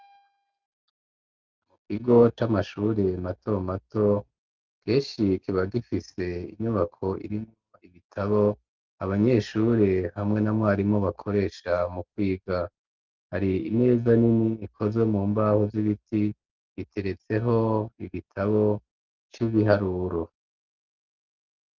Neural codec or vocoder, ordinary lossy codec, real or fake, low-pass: none; Opus, 32 kbps; real; 7.2 kHz